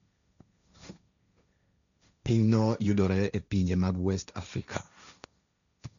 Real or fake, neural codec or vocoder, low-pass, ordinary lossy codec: fake; codec, 16 kHz, 1.1 kbps, Voila-Tokenizer; 7.2 kHz; none